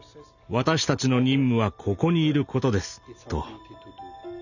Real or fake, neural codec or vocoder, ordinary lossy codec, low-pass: real; none; none; 7.2 kHz